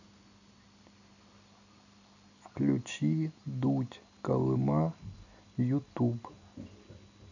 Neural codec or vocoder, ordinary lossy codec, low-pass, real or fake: none; none; 7.2 kHz; real